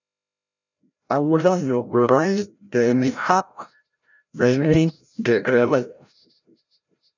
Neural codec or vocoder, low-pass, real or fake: codec, 16 kHz, 0.5 kbps, FreqCodec, larger model; 7.2 kHz; fake